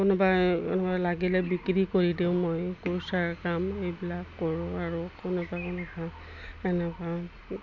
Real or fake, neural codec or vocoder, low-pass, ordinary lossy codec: real; none; 7.2 kHz; none